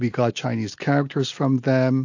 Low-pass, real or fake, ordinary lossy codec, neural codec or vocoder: 7.2 kHz; real; AAC, 48 kbps; none